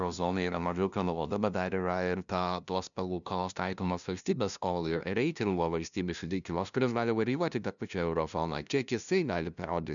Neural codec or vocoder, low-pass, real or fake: codec, 16 kHz, 0.5 kbps, FunCodec, trained on LibriTTS, 25 frames a second; 7.2 kHz; fake